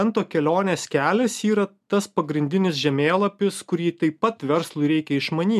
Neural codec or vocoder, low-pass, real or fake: none; 14.4 kHz; real